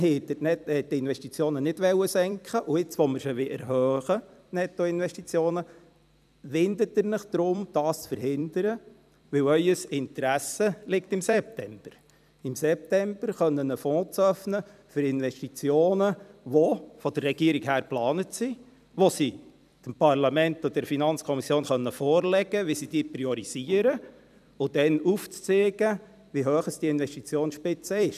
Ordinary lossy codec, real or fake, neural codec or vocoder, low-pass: none; fake; vocoder, 44.1 kHz, 128 mel bands every 256 samples, BigVGAN v2; 14.4 kHz